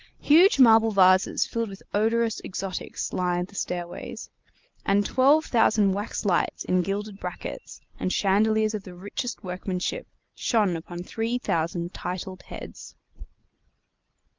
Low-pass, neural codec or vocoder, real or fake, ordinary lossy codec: 7.2 kHz; none; real; Opus, 24 kbps